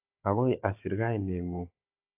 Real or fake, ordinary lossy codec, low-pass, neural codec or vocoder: fake; AAC, 24 kbps; 3.6 kHz; codec, 16 kHz, 16 kbps, FunCodec, trained on Chinese and English, 50 frames a second